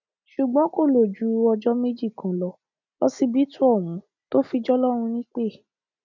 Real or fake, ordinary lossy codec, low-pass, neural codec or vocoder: real; none; 7.2 kHz; none